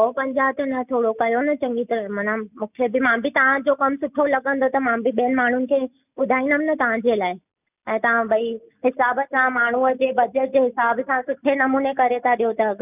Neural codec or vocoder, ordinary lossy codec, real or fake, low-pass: none; none; real; 3.6 kHz